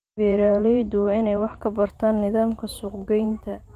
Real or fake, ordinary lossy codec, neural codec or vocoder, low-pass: fake; Opus, 24 kbps; vocoder, 44.1 kHz, 128 mel bands every 512 samples, BigVGAN v2; 14.4 kHz